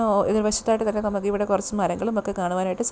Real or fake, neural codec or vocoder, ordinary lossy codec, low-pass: real; none; none; none